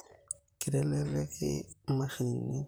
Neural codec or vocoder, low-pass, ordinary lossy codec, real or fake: vocoder, 44.1 kHz, 128 mel bands, Pupu-Vocoder; none; none; fake